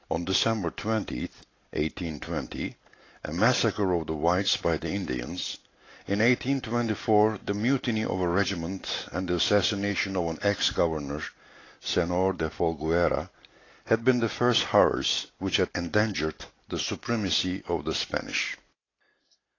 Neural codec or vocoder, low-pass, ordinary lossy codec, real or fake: none; 7.2 kHz; AAC, 32 kbps; real